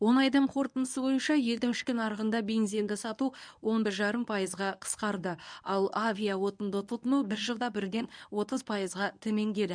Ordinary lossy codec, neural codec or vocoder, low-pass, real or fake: none; codec, 24 kHz, 0.9 kbps, WavTokenizer, medium speech release version 1; 9.9 kHz; fake